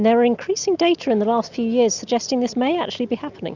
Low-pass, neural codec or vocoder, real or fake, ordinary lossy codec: 7.2 kHz; none; real; Opus, 64 kbps